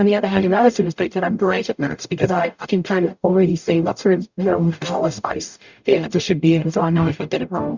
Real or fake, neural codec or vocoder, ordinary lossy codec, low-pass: fake; codec, 44.1 kHz, 0.9 kbps, DAC; Opus, 64 kbps; 7.2 kHz